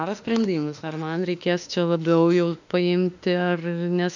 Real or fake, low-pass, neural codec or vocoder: fake; 7.2 kHz; autoencoder, 48 kHz, 32 numbers a frame, DAC-VAE, trained on Japanese speech